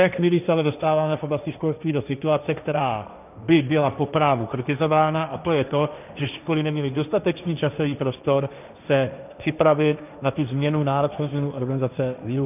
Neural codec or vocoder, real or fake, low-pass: codec, 16 kHz, 1.1 kbps, Voila-Tokenizer; fake; 3.6 kHz